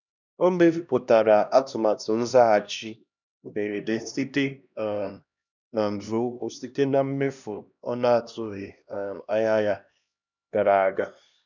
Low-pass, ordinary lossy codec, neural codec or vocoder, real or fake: 7.2 kHz; none; codec, 16 kHz, 1 kbps, X-Codec, HuBERT features, trained on LibriSpeech; fake